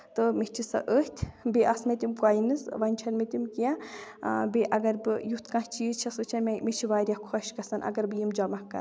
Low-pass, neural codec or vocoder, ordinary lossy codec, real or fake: none; none; none; real